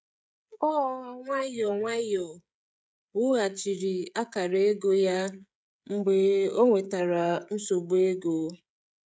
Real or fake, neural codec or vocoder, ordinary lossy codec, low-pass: fake; codec, 16 kHz, 16 kbps, FreqCodec, smaller model; none; none